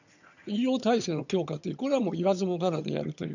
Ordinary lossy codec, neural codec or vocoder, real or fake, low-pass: none; vocoder, 22.05 kHz, 80 mel bands, HiFi-GAN; fake; 7.2 kHz